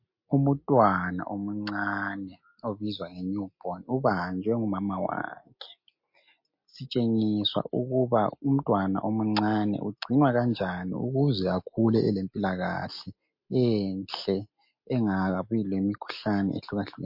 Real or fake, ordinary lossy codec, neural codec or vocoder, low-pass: real; MP3, 32 kbps; none; 5.4 kHz